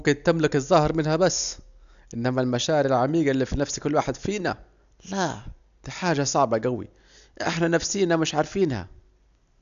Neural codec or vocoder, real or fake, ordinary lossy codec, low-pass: none; real; none; 7.2 kHz